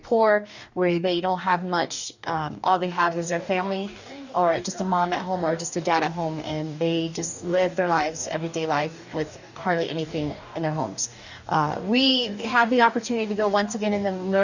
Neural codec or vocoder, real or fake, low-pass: codec, 44.1 kHz, 2.6 kbps, DAC; fake; 7.2 kHz